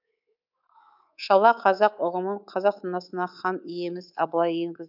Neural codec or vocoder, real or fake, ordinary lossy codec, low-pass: codec, 24 kHz, 3.1 kbps, DualCodec; fake; none; 5.4 kHz